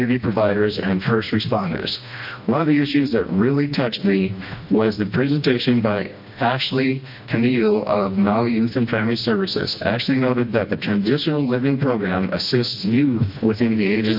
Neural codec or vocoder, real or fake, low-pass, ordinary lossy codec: codec, 16 kHz, 1 kbps, FreqCodec, smaller model; fake; 5.4 kHz; MP3, 32 kbps